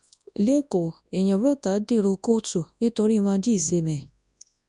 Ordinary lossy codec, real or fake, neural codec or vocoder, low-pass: none; fake; codec, 24 kHz, 0.9 kbps, WavTokenizer, large speech release; 10.8 kHz